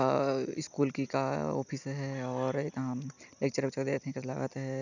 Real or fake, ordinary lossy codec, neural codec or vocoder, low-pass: real; none; none; 7.2 kHz